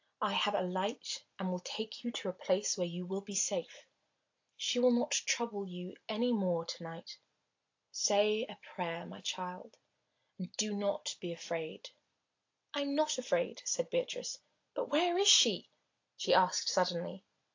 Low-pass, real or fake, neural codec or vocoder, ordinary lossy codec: 7.2 kHz; real; none; AAC, 48 kbps